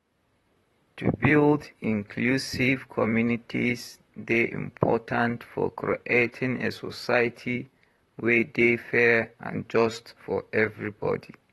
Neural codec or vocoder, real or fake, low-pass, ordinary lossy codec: vocoder, 44.1 kHz, 128 mel bands, Pupu-Vocoder; fake; 19.8 kHz; AAC, 32 kbps